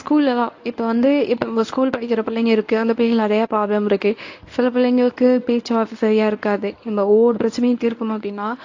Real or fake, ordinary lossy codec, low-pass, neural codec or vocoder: fake; AAC, 48 kbps; 7.2 kHz; codec, 24 kHz, 0.9 kbps, WavTokenizer, medium speech release version 1